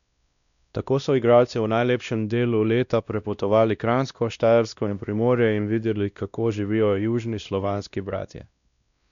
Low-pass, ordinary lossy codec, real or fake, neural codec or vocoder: 7.2 kHz; none; fake; codec, 16 kHz, 1 kbps, X-Codec, WavLM features, trained on Multilingual LibriSpeech